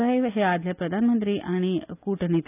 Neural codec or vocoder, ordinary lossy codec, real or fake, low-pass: none; none; real; 3.6 kHz